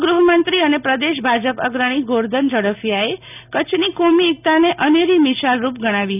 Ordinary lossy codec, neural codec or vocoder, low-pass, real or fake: none; none; 3.6 kHz; real